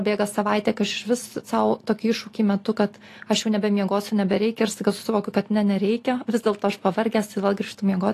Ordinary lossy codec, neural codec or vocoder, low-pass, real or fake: AAC, 48 kbps; none; 14.4 kHz; real